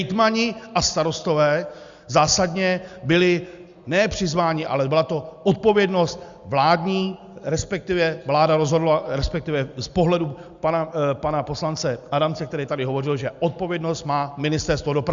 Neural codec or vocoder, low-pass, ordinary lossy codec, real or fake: none; 7.2 kHz; Opus, 64 kbps; real